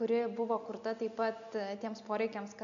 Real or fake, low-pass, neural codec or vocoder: real; 7.2 kHz; none